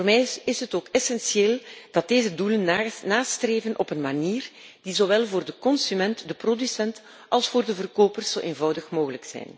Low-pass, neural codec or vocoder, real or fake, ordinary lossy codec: none; none; real; none